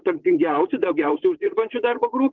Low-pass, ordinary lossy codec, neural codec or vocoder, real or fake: 7.2 kHz; Opus, 16 kbps; vocoder, 44.1 kHz, 80 mel bands, Vocos; fake